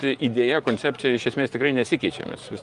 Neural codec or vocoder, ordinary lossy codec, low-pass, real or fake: vocoder, 24 kHz, 100 mel bands, Vocos; Opus, 32 kbps; 10.8 kHz; fake